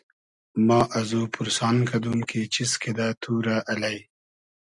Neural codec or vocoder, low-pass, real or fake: none; 10.8 kHz; real